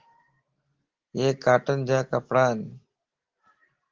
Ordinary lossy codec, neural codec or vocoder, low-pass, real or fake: Opus, 16 kbps; none; 7.2 kHz; real